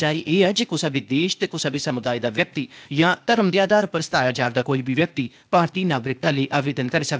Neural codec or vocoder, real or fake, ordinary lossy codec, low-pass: codec, 16 kHz, 0.8 kbps, ZipCodec; fake; none; none